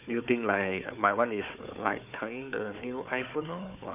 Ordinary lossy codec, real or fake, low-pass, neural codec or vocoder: none; fake; 3.6 kHz; codec, 16 kHz, 4 kbps, FunCodec, trained on Chinese and English, 50 frames a second